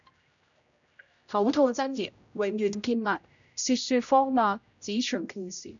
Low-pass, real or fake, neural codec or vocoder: 7.2 kHz; fake; codec, 16 kHz, 0.5 kbps, X-Codec, HuBERT features, trained on general audio